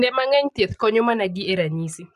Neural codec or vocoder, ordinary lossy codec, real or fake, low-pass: none; none; real; 14.4 kHz